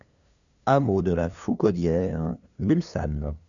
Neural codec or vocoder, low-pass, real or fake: codec, 16 kHz, 2 kbps, FunCodec, trained on LibriTTS, 25 frames a second; 7.2 kHz; fake